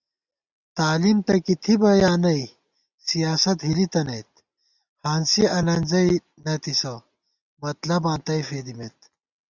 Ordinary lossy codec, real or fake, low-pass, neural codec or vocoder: Opus, 64 kbps; real; 7.2 kHz; none